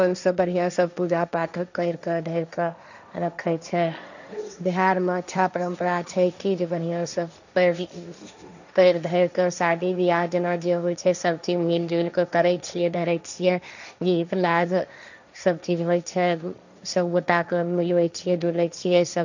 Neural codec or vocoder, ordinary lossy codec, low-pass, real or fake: codec, 16 kHz, 1.1 kbps, Voila-Tokenizer; none; 7.2 kHz; fake